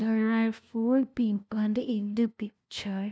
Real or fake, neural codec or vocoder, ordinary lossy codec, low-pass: fake; codec, 16 kHz, 0.5 kbps, FunCodec, trained on LibriTTS, 25 frames a second; none; none